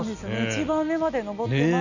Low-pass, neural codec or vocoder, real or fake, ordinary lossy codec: 7.2 kHz; none; real; none